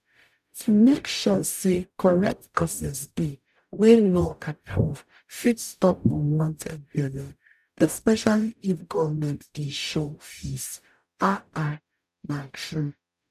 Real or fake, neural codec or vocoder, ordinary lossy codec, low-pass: fake; codec, 44.1 kHz, 0.9 kbps, DAC; none; 14.4 kHz